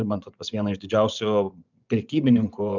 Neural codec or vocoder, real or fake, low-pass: vocoder, 24 kHz, 100 mel bands, Vocos; fake; 7.2 kHz